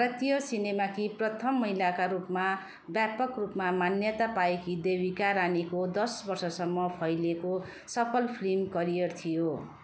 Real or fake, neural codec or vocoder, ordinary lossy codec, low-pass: real; none; none; none